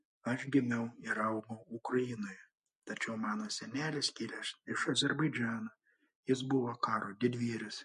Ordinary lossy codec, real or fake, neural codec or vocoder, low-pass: MP3, 48 kbps; real; none; 14.4 kHz